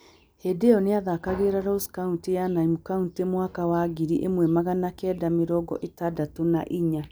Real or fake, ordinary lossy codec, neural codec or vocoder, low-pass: real; none; none; none